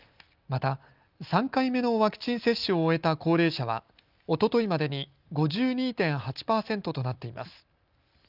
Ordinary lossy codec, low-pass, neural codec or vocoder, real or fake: Opus, 24 kbps; 5.4 kHz; none; real